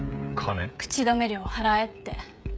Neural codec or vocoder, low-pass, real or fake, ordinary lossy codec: codec, 16 kHz, 16 kbps, FreqCodec, smaller model; none; fake; none